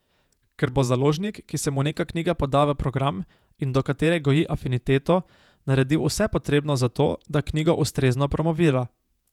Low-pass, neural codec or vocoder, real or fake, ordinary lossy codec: 19.8 kHz; vocoder, 48 kHz, 128 mel bands, Vocos; fake; none